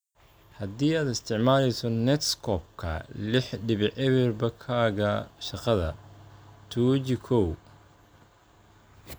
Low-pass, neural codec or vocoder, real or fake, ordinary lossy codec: none; none; real; none